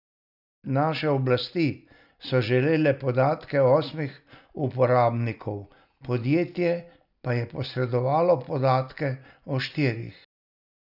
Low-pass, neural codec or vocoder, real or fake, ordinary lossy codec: 5.4 kHz; none; real; none